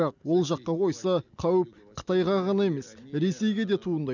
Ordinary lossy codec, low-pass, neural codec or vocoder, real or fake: none; 7.2 kHz; none; real